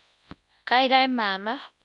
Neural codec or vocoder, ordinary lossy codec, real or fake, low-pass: codec, 24 kHz, 0.9 kbps, WavTokenizer, large speech release; none; fake; 10.8 kHz